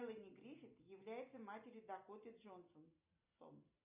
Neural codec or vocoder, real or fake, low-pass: none; real; 3.6 kHz